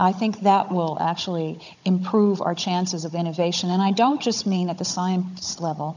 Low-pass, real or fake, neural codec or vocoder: 7.2 kHz; fake; codec, 16 kHz, 16 kbps, FunCodec, trained on Chinese and English, 50 frames a second